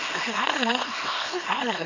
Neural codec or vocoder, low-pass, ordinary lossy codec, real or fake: codec, 24 kHz, 0.9 kbps, WavTokenizer, small release; 7.2 kHz; none; fake